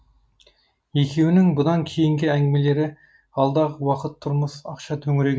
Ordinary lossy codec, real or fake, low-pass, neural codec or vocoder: none; real; none; none